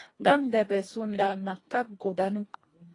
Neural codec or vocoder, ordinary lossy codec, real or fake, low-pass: codec, 24 kHz, 1.5 kbps, HILCodec; AAC, 32 kbps; fake; 10.8 kHz